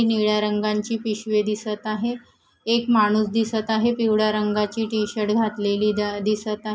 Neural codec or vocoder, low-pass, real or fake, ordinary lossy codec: none; none; real; none